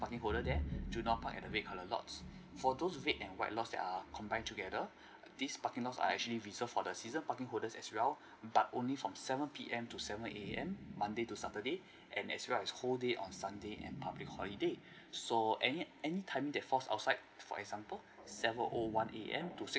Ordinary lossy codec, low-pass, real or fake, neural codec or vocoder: none; none; real; none